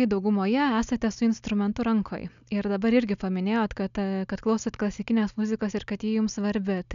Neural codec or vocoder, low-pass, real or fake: none; 7.2 kHz; real